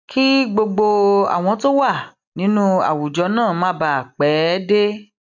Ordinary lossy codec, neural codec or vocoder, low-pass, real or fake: none; none; 7.2 kHz; real